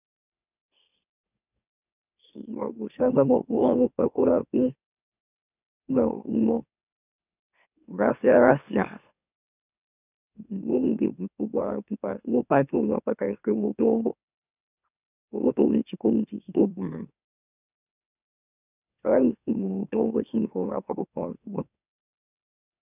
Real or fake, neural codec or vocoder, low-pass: fake; autoencoder, 44.1 kHz, a latent of 192 numbers a frame, MeloTTS; 3.6 kHz